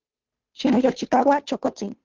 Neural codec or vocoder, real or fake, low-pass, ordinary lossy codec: codec, 16 kHz, 2 kbps, FunCodec, trained on Chinese and English, 25 frames a second; fake; 7.2 kHz; Opus, 24 kbps